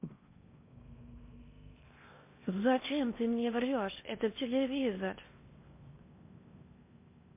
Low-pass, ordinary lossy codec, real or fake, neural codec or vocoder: 3.6 kHz; MP3, 24 kbps; fake; codec, 16 kHz in and 24 kHz out, 0.8 kbps, FocalCodec, streaming, 65536 codes